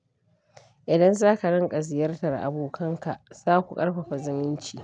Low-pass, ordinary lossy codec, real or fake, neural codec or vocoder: 9.9 kHz; none; real; none